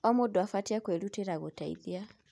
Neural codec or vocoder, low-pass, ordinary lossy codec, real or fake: none; none; none; real